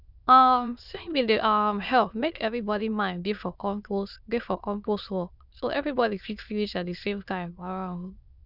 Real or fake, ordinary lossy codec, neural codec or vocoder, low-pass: fake; none; autoencoder, 22.05 kHz, a latent of 192 numbers a frame, VITS, trained on many speakers; 5.4 kHz